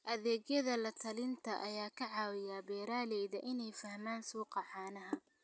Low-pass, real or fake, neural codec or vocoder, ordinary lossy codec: none; real; none; none